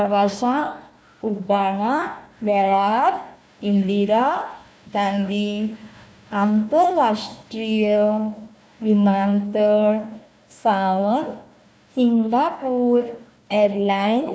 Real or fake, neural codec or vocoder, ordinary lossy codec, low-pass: fake; codec, 16 kHz, 1 kbps, FunCodec, trained on Chinese and English, 50 frames a second; none; none